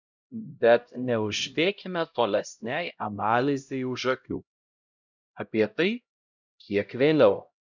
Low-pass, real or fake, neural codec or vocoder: 7.2 kHz; fake; codec, 16 kHz, 0.5 kbps, X-Codec, HuBERT features, trained on LibriSpeech